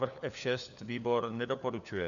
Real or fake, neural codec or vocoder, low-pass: fake; codec, 16 kHz, 4 kbps, FunCodec, trained on LibriTTS, 50 frames a second; 7.2 kHz